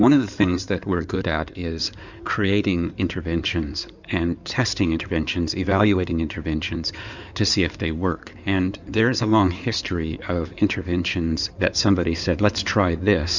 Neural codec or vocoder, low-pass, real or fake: codec, 16 kHz in and 24 kHz out, 2.2 kbps, FireRedTTS-2 codec; 7.2 kHz; fake